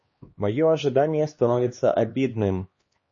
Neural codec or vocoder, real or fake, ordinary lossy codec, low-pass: codec, 16 kHz, 2 kbps, X-Codec, HuBERT features, trained on LibriSpeech; fake; MP3, 32 kbps; 7.2 kHz